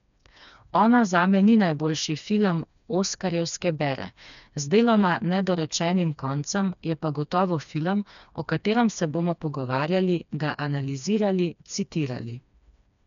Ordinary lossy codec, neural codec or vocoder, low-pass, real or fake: none; codec, 16 kHz, 2 kbps, FreqCodec, smaller model; 7.2 kHz; fake